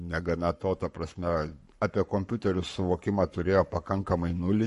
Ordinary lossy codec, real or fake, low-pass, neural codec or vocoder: MP3, 48 kbps; fake; 14.4 kHz; codec, 44.1 kHz, 7.8 kbps, Pupu-Codec